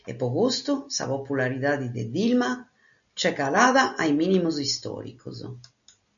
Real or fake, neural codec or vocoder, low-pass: real; none; 7.2 kHz